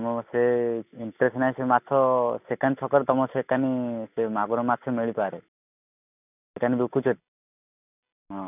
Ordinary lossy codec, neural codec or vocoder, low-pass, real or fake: none; none; 3.6 kHz; real